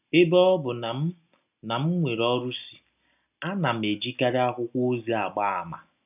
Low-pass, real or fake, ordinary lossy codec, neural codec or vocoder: 3.6 kHz; real; none; none